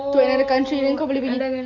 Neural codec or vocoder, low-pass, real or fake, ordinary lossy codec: none; 7.2 kHz; real; none